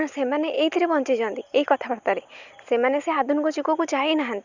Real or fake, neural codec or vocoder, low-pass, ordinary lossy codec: real; none; 7.2 kHz; Opus, 64 kbps